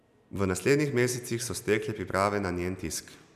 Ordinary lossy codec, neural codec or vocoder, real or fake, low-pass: none; none; real; 14.4 kHz